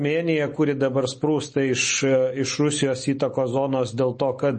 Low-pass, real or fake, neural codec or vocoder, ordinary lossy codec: 10.8 kHz; real; none; MP3, 32 kbps